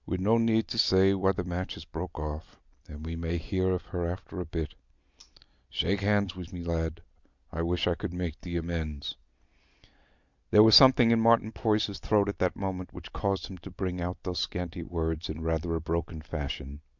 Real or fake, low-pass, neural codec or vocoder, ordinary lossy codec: real; 7.2 kHz; none; Opus, 64 kbps